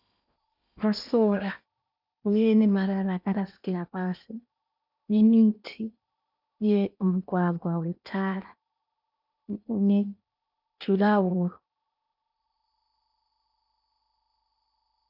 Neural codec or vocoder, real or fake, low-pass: codec, 16 kHz in and 24 kHz out, 0.8 kbps, FocalCodec, streaming, 65536 codes; fake; 5.4 kHz